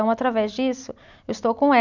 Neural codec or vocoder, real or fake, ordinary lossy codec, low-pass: none; real; none; 7.2 kHz